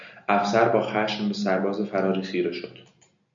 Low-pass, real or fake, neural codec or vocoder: 7.2 kHz; real; none